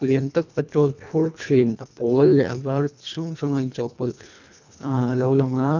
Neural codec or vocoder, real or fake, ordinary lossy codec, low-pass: codec, 24 kHz, 1.5 kbps, HILCodec; fake; none; 7.2 kHz